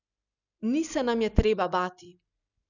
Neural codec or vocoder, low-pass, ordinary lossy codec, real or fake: none; 7.2 kHz; none; real